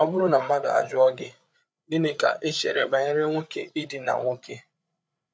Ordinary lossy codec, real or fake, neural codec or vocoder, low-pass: none; fake; codec, 16 kHz, 8 kbps, FreqCodec, larger model; none